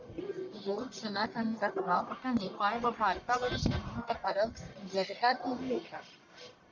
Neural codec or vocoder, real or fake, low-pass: codec, 44.1 kHz, 1.7 kbps, Pupu-Codec; fake; 7.2 kHz